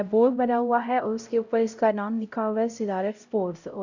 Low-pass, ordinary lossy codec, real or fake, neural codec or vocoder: 7.2 kHz; none; fake; codec, 16 kHz, 0.5 kbps, X-Codec, HuBERT features, trained on LibriSpeech